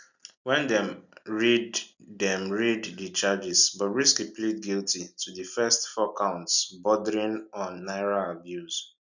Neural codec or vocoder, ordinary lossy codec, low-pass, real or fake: none; none; 7.2 kHz; real